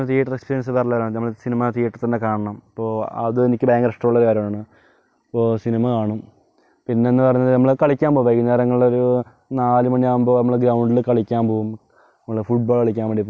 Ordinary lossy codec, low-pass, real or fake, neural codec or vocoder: none; none; real; none